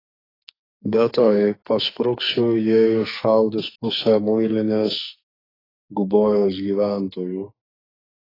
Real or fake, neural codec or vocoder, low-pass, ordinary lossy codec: fake; codec, 44.1 kHz, 2.6 kbps, SNAC; 5.4 kHz; AAC, 24 kbps